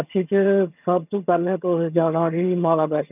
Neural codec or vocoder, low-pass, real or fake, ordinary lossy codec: vocoder, 22.05 kHz, 80 mel bands, HiFi-GAN; 3.6 kHz; fake; none